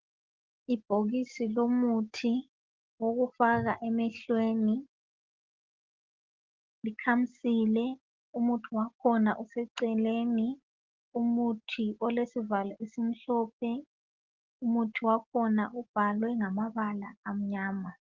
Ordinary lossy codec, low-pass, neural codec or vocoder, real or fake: Opus, 16 kbps; 7.2 kHz; none; real